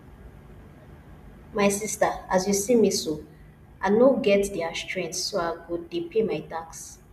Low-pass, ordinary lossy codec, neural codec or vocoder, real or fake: 14.4 kHz; none; none; real